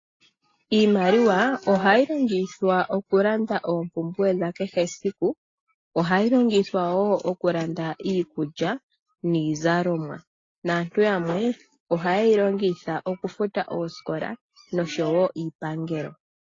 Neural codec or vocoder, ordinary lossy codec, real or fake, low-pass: none; AAC, 32 kbps; real; 7.2 kHz